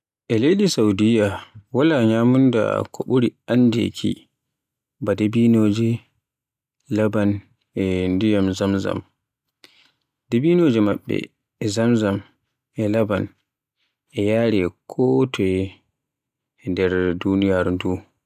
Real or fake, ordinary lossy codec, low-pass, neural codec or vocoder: real; none; 14.4 kHz; none